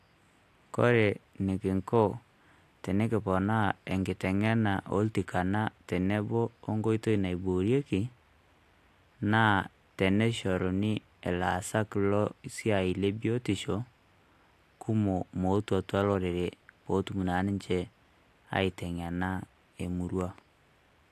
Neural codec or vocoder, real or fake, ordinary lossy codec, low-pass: none; real; AAC, 64 kbps; 14.4 kHz